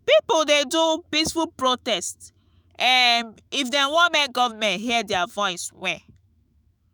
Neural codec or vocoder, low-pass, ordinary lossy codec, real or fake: autoencoder, 48 kHz, 128 numbers a frame, DAC-VAE, trained on Japanese speech; none; none; fake